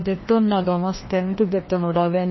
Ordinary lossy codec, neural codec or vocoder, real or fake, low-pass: MP3, 24 kbps; codec, 16 kHz, 1 kbps, FreqCodec, larger model; fake; 7.2 kHz